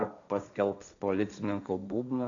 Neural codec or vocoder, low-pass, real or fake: codec, 16 kHz, 1.1 kbps, Voila-Tokenizer; 7.2 kHz; fake